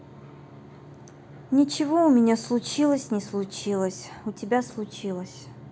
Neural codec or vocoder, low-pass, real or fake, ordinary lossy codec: none; none; real; none